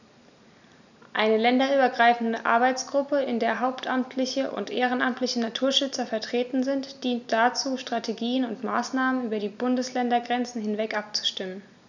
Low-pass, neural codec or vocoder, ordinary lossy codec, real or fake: 7.2 kHz; none; none; real